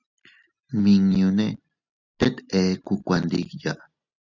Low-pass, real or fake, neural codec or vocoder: 7.2 kHz; real; none